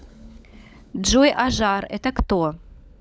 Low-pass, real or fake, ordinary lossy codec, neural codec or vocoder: none; fake; none; codec, 16 kHz, 16 kbps, FunCodec, trained on LibriTTS, 50 frames a second